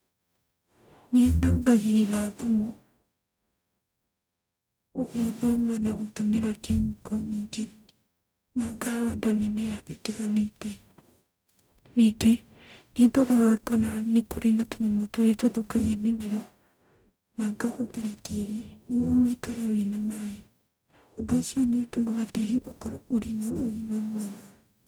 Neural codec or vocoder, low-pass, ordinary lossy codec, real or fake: codec, 44.1 kHz, 0.9 kbps, DAC; none; none; fake